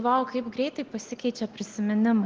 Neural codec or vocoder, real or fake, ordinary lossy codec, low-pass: none; real; Opus, 16 kbps; 7.2 kHz